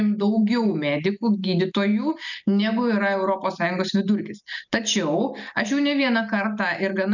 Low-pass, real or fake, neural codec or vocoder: 7.2 kHz; real; none